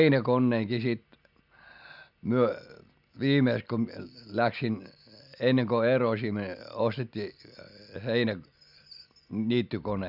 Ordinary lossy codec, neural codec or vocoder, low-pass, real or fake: none; none; 5.4 kHz; real